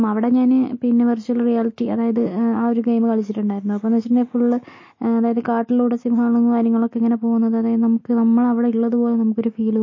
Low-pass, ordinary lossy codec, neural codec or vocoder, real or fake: 7.2 kHz; MP3, 32 kbps; none; real